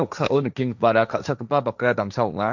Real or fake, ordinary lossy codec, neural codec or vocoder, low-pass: fake; none; codec, 16 kHz, 1.1 kbps, Voila-Tokenizer; 7.2 kHz